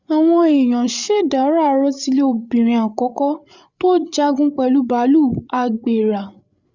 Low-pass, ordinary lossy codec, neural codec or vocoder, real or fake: 7.2 kHz; Opus, 64 kbps; codec, 16 kHz, 8 kbps, FreqCodec, larger model; fake